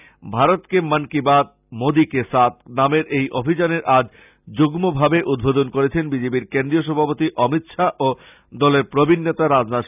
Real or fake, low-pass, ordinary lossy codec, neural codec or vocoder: real; 3.6 kHz; none; none